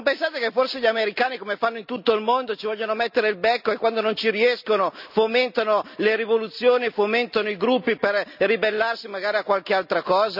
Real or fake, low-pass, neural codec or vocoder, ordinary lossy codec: real; 5.4 kHz; none; none